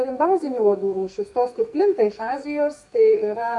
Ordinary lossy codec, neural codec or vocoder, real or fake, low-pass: MP3, 96 kbps; codec, 44.1 kHz, 2.6 kbps, SNAC; fake; 10.8 kHz